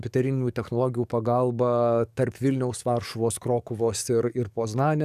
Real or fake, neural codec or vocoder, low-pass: fake; codec, 44.1 kHz, 7.8 kbps, DAC; 14.4 kHz